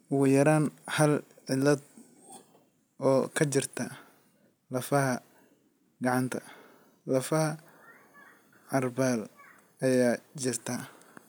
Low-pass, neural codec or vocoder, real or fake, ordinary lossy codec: none; none; real; none